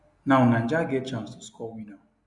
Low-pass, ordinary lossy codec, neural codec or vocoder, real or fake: 10.8 kHz; none; none; real